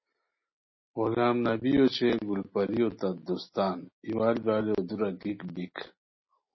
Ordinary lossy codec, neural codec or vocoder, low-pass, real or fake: MP3, 24 kbps; none; 7.2 kHz; real